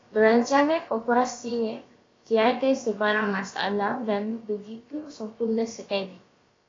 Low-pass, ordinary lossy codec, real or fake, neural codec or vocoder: 7.2 kHz; AAC, 32 kbps; fake; codec, 16 kHz, about 1 kbps, DyCAST, with the encoder's durations